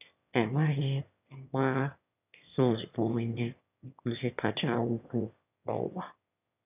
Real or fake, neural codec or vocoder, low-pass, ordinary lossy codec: fake; autoencoder, 22.05 kHz, a latent of 192 numbers a frame, VITS, trained on one speaker; 3.6 kHz; none